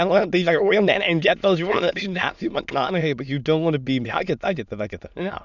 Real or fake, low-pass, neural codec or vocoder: fake; 7.2 kHz; autoencoder, 22.05 kHz, a latent of 192 numbers a frame, VITS, trained on many speakers